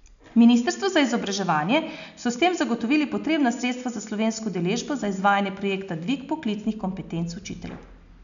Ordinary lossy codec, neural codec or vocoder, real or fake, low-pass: none; none; real; 7.2 kHz